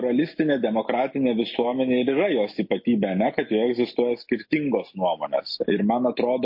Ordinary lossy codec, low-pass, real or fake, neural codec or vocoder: MP3, 32 kbps; 5.4 kHz; real; none